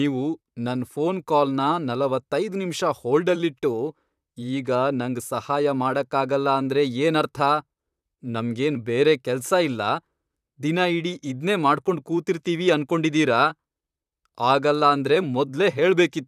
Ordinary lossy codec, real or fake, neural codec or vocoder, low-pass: none; fake; vocoder, 44.1 kHz, 128 mel bands, Pupu-Vocoder; 14.4 kHz